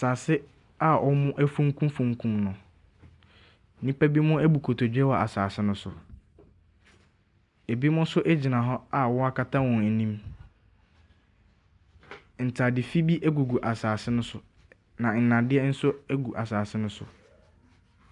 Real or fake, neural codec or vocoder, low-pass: real; none; 10.8 kHz